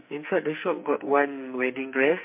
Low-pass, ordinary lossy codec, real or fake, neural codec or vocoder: 3.6 kHz; MP3, 32 kbps; fake; codec, 44.1 kHz, 2.6 kbps, SNAC